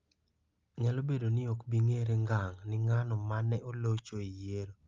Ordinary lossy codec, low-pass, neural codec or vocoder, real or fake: Opus, 32 kbps; 7.2 kHz; none; real